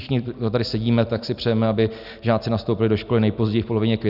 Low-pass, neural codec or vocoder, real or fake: 5.4 kHz; none; real